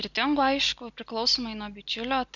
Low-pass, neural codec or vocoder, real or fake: 7.2 kHz; none; real